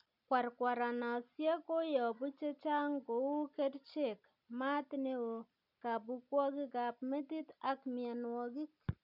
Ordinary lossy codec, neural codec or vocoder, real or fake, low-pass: none; none; real; 5.4 kHz